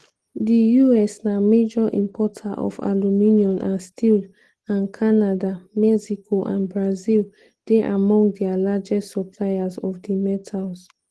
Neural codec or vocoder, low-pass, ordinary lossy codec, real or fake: none; 10.8 kHz; Opus, 16 kbps; real